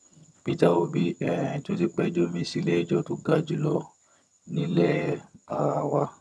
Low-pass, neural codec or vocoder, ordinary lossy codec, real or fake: none; vocoder, 22.05 kHz, 80 mel bands, HiFi-GAN; none; fake